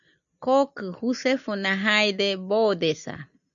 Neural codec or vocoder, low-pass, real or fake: none; 7.2 kHz; real